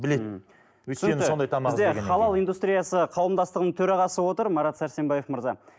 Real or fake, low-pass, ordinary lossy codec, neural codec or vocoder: real; none; none; none